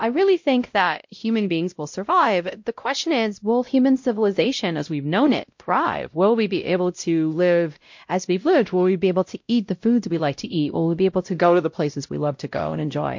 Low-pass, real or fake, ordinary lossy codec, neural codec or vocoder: 7.2 kHz; fake; MP3, 48 kbps; codec, 16 kHz, 0.5 kbps, X-Codec, WavLM features, trained on Multilingual LibriSpeech